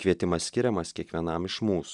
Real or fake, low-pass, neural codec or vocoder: real; 10.8 kHz; none